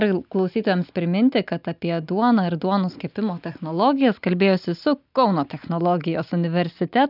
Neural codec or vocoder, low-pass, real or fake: none; 5.4 kHz; real